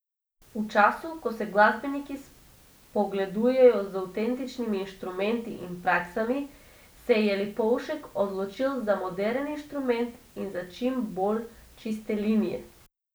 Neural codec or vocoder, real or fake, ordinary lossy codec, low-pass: none; real; none; none